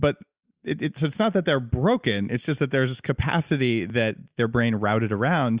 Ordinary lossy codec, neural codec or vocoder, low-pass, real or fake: Opus, 64 kbps; none; 3.6 kHz; real